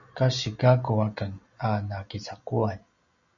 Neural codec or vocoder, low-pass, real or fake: none; 7.2 kHz; real